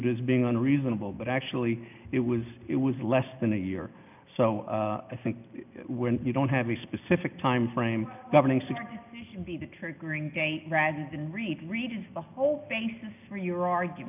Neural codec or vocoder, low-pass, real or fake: none; 3.6 kHz; real